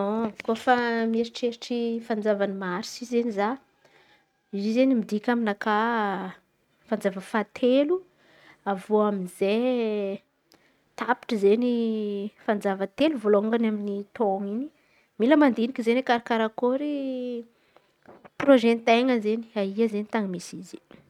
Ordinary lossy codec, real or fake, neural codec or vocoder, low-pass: none; real; none; 19.8 kHz